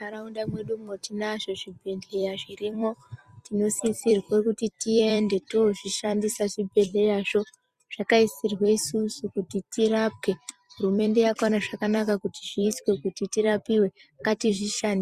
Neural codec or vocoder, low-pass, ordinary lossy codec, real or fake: vocoder, 44.1 kHz, 128 mel bands every 256 samples, BigVGAN v2; 14.4 kHz; Opus, 64 kbps; fake